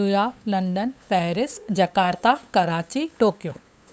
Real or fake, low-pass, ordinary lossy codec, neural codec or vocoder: fake; none; none; codec, 16 kHz, 8 kbps, FunCodec, trained on LibriTTS, 25 frames a second